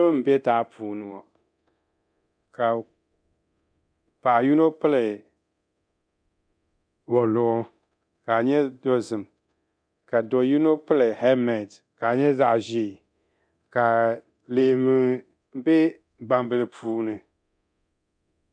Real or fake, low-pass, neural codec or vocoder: fake; 9.9 kHz; codec, 24 kHz, 0.9 kbps, DualCodec